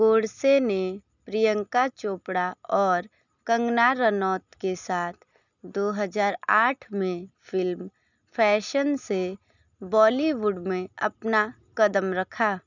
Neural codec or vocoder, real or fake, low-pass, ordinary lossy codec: none; real; 7.2 kHz; none